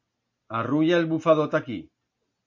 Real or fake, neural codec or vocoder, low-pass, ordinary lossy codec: real; none; 7.2 kHz; MP3, 64 kbps